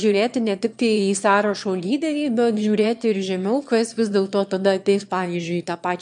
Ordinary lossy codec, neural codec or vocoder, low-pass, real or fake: MP3, 64 kbps; autoencoder, 22.05 kHz, a latent of 192 numbers a frame, VITS, trained on one speaker; 9.9 kHz; fake